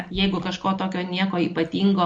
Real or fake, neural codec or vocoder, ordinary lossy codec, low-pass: real; none; MP3, 48 kbps; 9.9 kHz